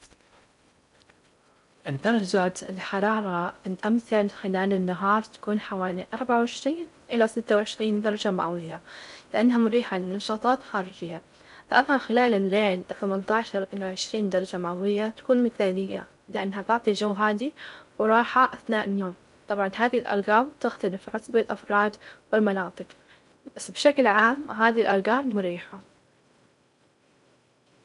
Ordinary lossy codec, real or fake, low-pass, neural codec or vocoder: none; fake; 10.8 kHz; codec, 16 kHz in and 24 kHz out, 0.6 kbps, FocalCodec, streaming, 4096 codes